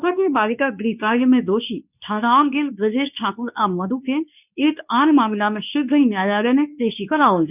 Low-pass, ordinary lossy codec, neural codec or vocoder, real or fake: 3.6 kHz; none; codec, 24 kHz, 0.9 kbps, WavTokenizer, medium speech release version 2; fake